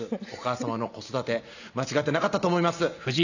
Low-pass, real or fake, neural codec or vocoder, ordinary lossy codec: 7.2 kHz; real; none; none